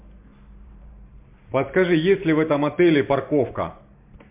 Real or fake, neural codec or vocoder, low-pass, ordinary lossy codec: fake; vocoder, 44.1 kHz, 128 mel bands every 512 samples, BigVGAN v2; 3.6 kHz; MP3, 32 kbps